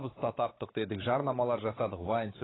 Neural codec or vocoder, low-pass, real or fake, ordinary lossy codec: codec, 16 kHz, 4 kbps, X-Codec, WavLM features, trained on Multilingual LibriSpeech; 7.2 kHz; fake; AAC, 16 kbps